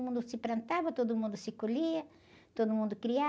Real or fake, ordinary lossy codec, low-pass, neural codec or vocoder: real; none; none; none